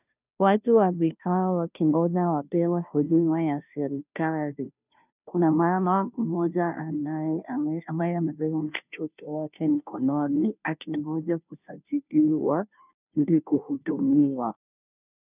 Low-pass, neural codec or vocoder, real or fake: 3.6 kHz; codec, 16 kHz, 0.5 kbps, FunCodec, trained on Chinese and English, 25 frames a second; fake